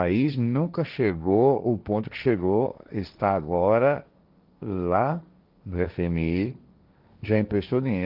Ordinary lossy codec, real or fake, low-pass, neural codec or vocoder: Opus, 24 kbps; fake; 5.4 kHz; codec, 16 kHz, 1.1 kbps, Voila-Tokenizer